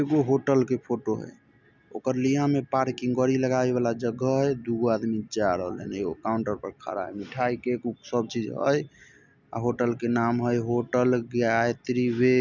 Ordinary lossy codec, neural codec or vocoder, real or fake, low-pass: none; none; real; 7.2 kHz